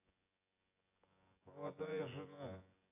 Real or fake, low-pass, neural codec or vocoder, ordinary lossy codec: fake; 3.6 kHz; vocoder, 24 kHz, 100 mel bands, Vocos; AAC, 32 kbps